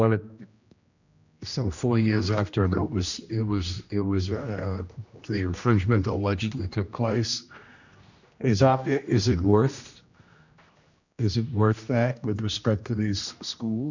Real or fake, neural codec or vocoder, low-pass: fake; codec, 16 kHz, 1 kbps, X-Codec, HuBERT features, trained on general audio; 7.2 kHz